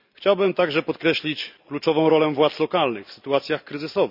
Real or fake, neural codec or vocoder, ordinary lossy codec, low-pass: real; none; none; 5.4 kHz